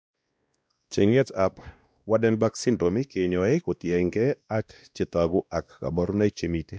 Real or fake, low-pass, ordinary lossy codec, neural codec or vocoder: fake; none; none; codec, 16 kHz, 1 kbps, X-Codec, WavLM features, trained on Multilingual LibriSpeech